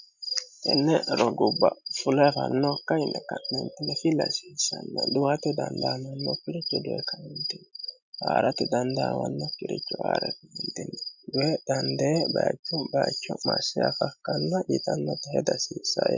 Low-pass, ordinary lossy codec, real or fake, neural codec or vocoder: 7.2 kHz; MP3, 64 kbps; real; none